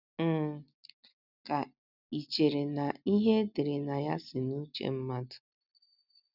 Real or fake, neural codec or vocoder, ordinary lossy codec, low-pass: real; none; none; 5.4 kHz